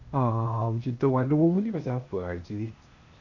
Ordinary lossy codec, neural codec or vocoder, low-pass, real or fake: none; codec, 16 kHz, 0.8 kbps, ZipCodec; 7.2 kHz; fake